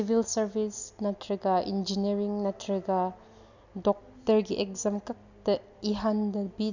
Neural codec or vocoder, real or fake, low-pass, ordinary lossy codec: none; real; 7.2 kHz; none